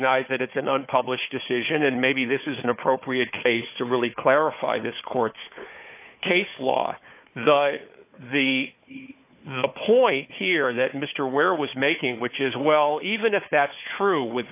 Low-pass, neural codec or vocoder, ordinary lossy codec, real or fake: 3.6 kHz; codec, 16 kHz, 4 kbps, FunCodec, trained on Chinese and English, 50 frames a second; AAC, 32 kbps; fake